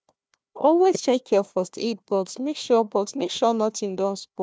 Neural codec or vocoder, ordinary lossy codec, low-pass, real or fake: codec, 16 kHz, 1 kbps, FunCodec, trained on Chinese and English, 50 frames a second; none; none; fake